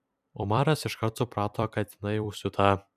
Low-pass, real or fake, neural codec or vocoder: 14.4 kHz; fake; vocoder, 44.1 kHz, 128 mel bands every 256 samples, BigVGAN v2